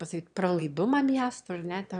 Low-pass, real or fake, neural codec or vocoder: 9.9 kHz; fake; autoencoder, 22.05 kHz, a latent of 192 numbers a frame, VITS, trained on one speaker